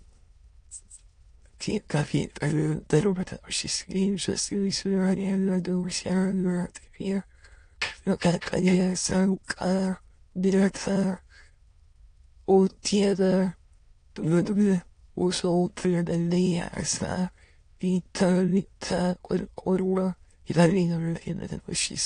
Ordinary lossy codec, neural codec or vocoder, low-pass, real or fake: AAC, 48 kbps; autoencoder, 22.05 kHz, a latent of 192 numbers a frame, VITS, trained on many speakers; 9.9 kHz; fake